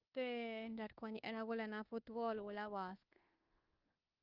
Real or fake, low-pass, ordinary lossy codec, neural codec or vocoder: fake; 5.4 kHz; Opus, 32 kbps; codec, 24 kHz, 1.2 kbps, DualCodec